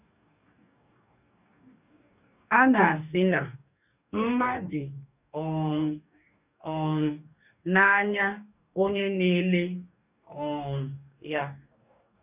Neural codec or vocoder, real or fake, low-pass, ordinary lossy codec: codec, 44.1 kHz, 2.6 kbps, DAC; fake; 3.6 kHz; none